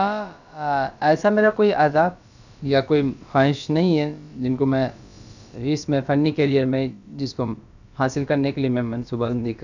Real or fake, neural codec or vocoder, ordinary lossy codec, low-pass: fake; codec, 16 kHz, about 1 kbps, DyCAST, with the encoder's durations; none; 7.2 kHz